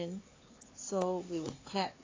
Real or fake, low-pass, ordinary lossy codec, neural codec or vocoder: fake; 7.2 kHz; AAC, 32 kbps; codec, 16 kHz, 4 kbps, X-Codec, HuBERT features, trained on LibriSpeech